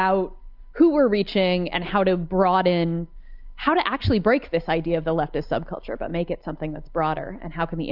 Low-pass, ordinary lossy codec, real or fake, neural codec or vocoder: 5.4 kHz; Opus, 24 kbps; real; none